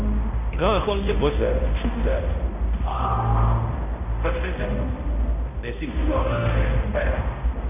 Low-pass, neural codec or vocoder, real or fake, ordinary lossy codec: 3.6 kHz; codec, 16 kHz, 0.5 kbps, X-Codec, HuBERT features, trained on balanced general audio; fake; AAC, 32 kbps